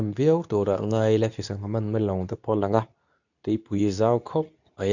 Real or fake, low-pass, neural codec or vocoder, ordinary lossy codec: fake; 7.2 kHz; codec, 24 kHz, 0.9 kbps, WavTokenizer, medium speech release version 2; none